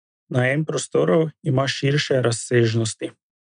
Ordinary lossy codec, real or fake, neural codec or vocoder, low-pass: none; real; none; 9.9 kHz